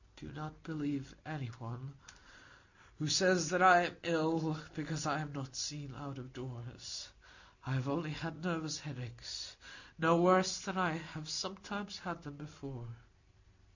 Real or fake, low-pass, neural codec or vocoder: real; 7.2 kHz; none